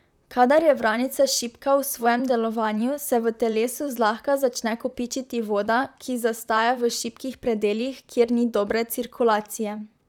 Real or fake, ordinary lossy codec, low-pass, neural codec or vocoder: fake; none; 19.8 kHz; vocoder, 44.1 kHz, 128 mel bands, Pupu-Vocoder